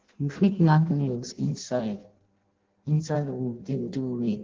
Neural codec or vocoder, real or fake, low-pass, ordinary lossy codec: codec, 16 kHz in and 24 kHz out, 0.6 kbps, FireRedTTS-2 codec; fake; 7.2 kHz; Opus, 16 kbps